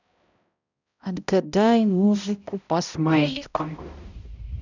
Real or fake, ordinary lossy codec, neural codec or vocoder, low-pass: fake; none; codec, 16 kHz, 0.5 kbps, X-Codec, HuBERT features, trained on balanced general audio; 7.2 kHz